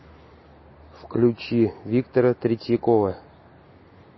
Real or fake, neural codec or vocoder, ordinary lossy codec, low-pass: real; none; MP3, 24 kbps; 7.2 kHz